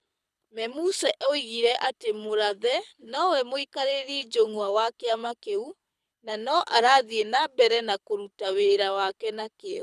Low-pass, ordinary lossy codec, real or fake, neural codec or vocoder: none; none; fake; codec, 24 kHz, 6 kbps, HILCodec